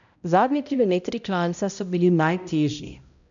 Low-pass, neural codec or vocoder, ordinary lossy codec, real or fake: 7.2 kHz; codec, 16 kHz, 0.5 kbps, X-Codec, HuBERT features, trained on balanced general audio; none; fake